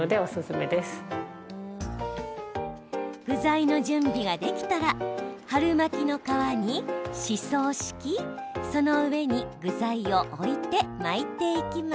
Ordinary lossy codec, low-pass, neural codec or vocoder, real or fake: none; none; none; real